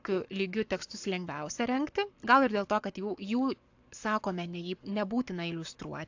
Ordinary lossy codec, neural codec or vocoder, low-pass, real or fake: AAC, 48 kbps; codec, 44.1 kHz, 7.8 kbps, Pupu-Codec; 7.2 kHz; fake